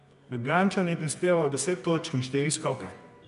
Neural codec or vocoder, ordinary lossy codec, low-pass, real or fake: codec, 24 kHz, 0.9 kbps, WavTokenizer, medium music audio release; none; 10.8 kHz; fake